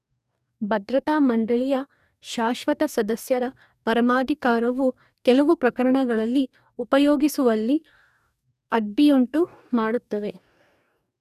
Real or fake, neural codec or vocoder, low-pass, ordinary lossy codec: fake; codec, 44.1 kHz, 2.6 kbps, DAC; 14.4 kHz; none